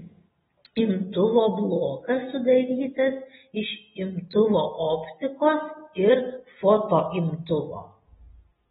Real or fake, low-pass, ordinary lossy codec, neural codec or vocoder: real; 7.2 kHz; AAC, 16 kbps; none